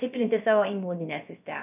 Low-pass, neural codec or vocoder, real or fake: 3.6 kHz; codec, 16 kHz, about 1 kbps, DyCAST, with the encoder's durations; fake